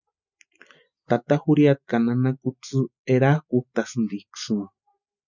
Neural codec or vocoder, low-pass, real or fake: none; 7.2 kHz; real